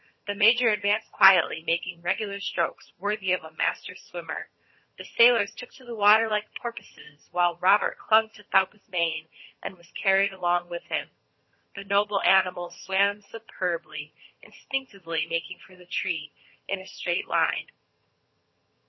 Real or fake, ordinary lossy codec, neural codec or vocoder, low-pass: fake; MP3, 24 kbps; vocoder, 22.05 kHz, 80 mel bands, HiFi-GAN; 7.2 kHz